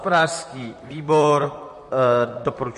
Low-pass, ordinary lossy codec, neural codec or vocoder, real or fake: 14.4 kHz; MP3, 48 kbps; vocoder, 44.1 kHz, 128 mel bands, Pupu-Vocoder; fake